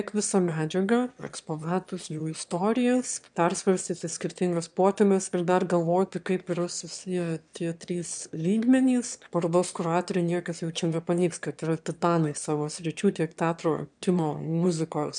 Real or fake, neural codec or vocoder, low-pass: fake; autoencoder, 22.05 kHz, a latent of 192 numbers a frame, VITS, trained on one speaker; 9.9 kHz